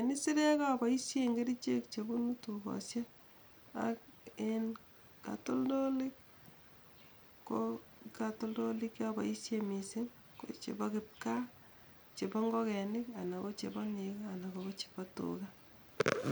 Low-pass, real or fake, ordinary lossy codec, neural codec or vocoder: none; real; none; none